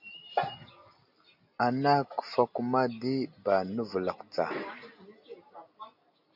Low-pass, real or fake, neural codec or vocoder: 5.4 kHz; real; none